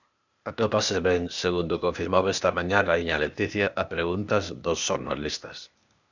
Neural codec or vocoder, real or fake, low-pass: codec, 16 kHz, 0.8 kbps, ZipCodec; fake; 7.2 kHz